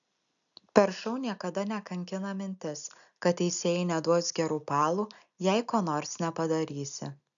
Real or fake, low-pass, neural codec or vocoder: real; 7.2 kHz; none